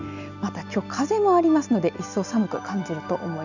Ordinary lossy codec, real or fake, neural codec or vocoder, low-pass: none; real; none; 7.2 kHz